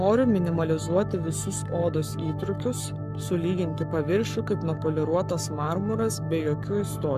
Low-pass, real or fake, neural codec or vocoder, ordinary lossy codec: 14.4 kHz; fake; codec, 44.1 kHz, 7.8 kbps, Pupu-Codec; MP3, 96 kbps